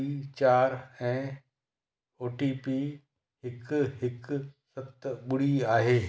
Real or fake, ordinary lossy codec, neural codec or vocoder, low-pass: real; none; none; none